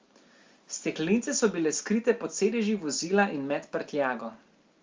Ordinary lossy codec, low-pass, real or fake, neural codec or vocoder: Opus, 32 kbps; 7.2 kHz; real; none